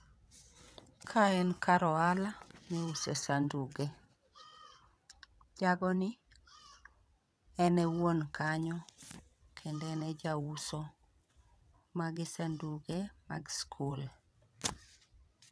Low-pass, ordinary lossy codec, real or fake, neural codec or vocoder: none; none; fake; vocoder, 22.05 kHz, 80 mel bands, WaveNeXt